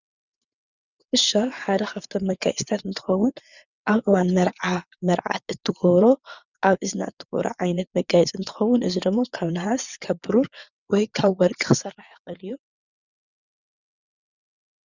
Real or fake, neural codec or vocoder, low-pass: fake; vocoder, 22.05 kHz, 80 mel bands, WaveNeXt; 7.2 kHz